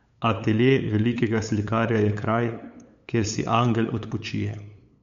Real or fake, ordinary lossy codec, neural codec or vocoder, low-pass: fake; MP3, 64 kbps; codec, 16 kHz, 8 kbps, FunCodec, trained on LibriTTS, 25 frames a second; 7.2 kHz